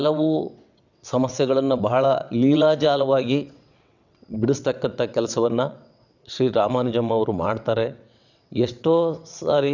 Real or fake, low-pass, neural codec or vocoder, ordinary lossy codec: fake; 7.2 kHz; vocoder, 22.05 kHz, 80 mel bands, WaveNeXt; none